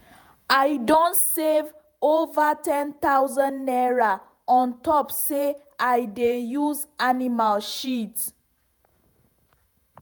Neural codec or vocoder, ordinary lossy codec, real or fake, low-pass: vocoder, 48 kHz, 128 mel bands, Vocos; none; fake; none